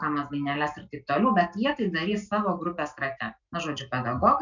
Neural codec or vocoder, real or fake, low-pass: none; real; 7.2 kHz